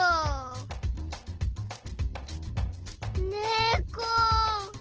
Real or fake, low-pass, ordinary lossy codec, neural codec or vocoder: real; 7.2 kHz; Opus, 16 kbps; none